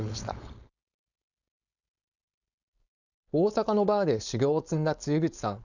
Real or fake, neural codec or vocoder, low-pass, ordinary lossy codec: fake; codec, 16 kHz, 4.8 kbps, FACodec; 7.2 kHz; none